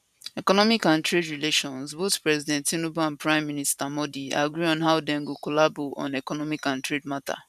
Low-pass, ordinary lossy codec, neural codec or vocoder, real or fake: 14.4 kHz; none; vocoder, 44.1 kHz, 128 mel bands every 512 samples, BigVGAN v2; fake